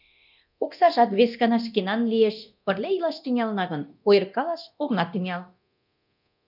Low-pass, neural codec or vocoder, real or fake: 5.4 kHz; codec, 24 kHz, 0.9 kbps, DualCodec; fake